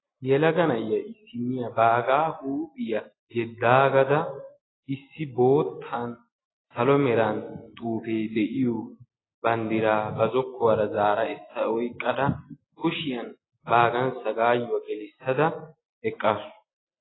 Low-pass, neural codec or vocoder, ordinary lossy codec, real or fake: 7.2 kHz; none; AAC, 16 kbps; real